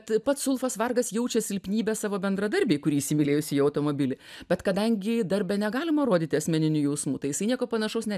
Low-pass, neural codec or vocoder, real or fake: 14.4 kHz; none; real